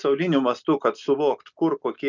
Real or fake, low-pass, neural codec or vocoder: real; 7.2 kHz; none